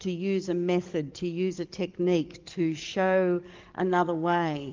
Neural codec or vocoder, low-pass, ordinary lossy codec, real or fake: codec, 44.1 kHz, 7.8 kbps, DAC; 7.2 kHz; Opus, 24 kbps; fake